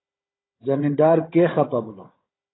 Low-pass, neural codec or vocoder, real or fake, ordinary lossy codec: 7.2 kHz; codec, 16 kHz, 16 kbps, FunCodec, trained on Chinese and English, 50 frames a second; fake; AAC, 16 kbps